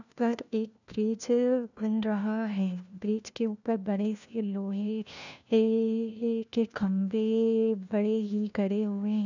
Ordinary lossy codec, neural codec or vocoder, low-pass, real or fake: none; codec, 16 kHz, 1 kbps, FunCodec, trained on LibriTTS, 50 frames a second; 7.2 kHz; fake